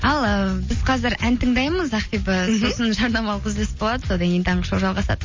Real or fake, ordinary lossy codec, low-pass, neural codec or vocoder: real; MP3, 32 kbps; 7.2 kHz; none